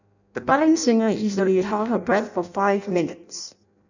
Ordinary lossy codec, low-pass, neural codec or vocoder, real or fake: none; 7.2 kHz; codec, 16 kHz in and 24 kHz out, 0.6 kbps, FireRedTTS-2 codec; fake